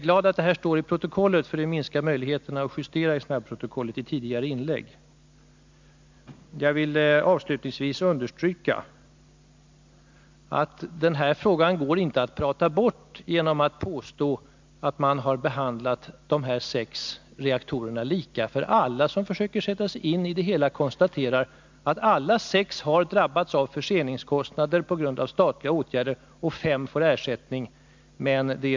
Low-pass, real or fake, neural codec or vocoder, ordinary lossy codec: 7.2 kHz; real; none; MP3, 64 kbps